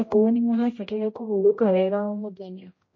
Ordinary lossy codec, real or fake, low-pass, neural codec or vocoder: MP3, 32 kbps; fake; 7.2 kHz; codec, 16 kHz, 0.5 kbps, X-Codec, HuBERT features, trained on general audio